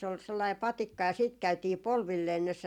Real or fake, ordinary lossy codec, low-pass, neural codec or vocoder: fake; none; 19.8 kHz; vocoder, 44.1 kHz, 128 mel bands every 256 samples, BigVGAN v2